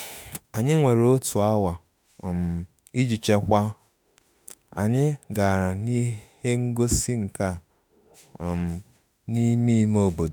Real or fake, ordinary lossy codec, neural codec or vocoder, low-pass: fake; none; autoencoder, 48 kHz, 32 numbers a frame, DAC-VAE, trained on Japanese speech; none